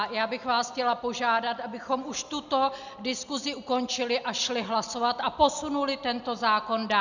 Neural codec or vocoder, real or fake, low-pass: none; real; 7.2 kHz